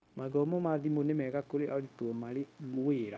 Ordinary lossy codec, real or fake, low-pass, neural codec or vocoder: none; fake; none; codec, 16 kHz, 0.9 kbps, LongCat-Audio-Codec